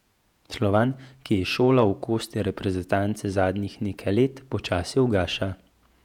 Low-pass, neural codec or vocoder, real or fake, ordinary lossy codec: 19.8 kHz; vocoder, 48 kHz, 128 mel bands, Vocos; fake; none